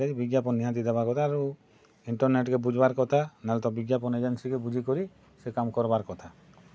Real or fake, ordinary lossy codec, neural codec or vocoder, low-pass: real; none; none; none